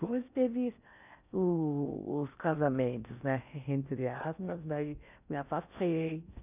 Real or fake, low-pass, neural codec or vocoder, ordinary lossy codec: fake; 3.6 kHz; codec, 16 kHz in and 24 kHz out, 0.6 kbps, FocalCodec, streaming, 4096 codes; none